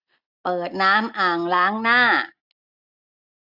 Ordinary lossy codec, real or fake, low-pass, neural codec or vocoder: AAC, 48 kbps; real; 5.4 kHz; none